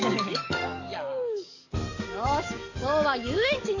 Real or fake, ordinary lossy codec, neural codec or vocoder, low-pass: fake; none; codec, 44.1 kHz, 7.8 kbps, DAC; 7.2 kHz